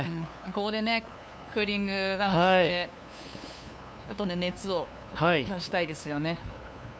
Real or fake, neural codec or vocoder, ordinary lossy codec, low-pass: fake; codec, 16 kHz, 2 kbps, FunCodec, trained on LibriTTS, 25 frames a second; none; none